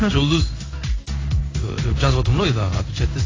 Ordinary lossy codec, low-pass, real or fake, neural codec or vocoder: AAC, 32 kbps; 7.2 kHz; fake; codec, 16 kHz in and 24 kHz out, 1 kbps, XY-Tokenizer